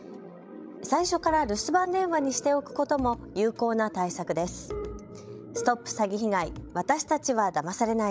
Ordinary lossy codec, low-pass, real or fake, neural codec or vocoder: none; none; fake; codec, 16 kHz, 16 kbps, FreqCodec, larger model